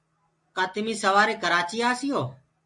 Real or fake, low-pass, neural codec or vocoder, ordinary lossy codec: real; 10.8 kHz; none; MP3, 48 kbps